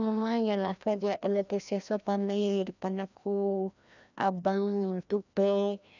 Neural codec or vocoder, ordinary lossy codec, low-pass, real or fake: codec, 16 kHz, 1 kbps, FreqCodec, larger model; none; 7.2 kHz; fake